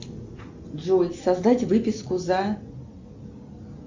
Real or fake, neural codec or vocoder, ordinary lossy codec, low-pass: real; none; MP3, 64 kbps; 7.2 kHz